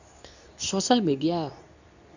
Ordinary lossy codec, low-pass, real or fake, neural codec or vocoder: none; 7.2 kHz; fake; codec, 24 kHz, 0.9 kbps, WavTokenizer, medium speech release version 2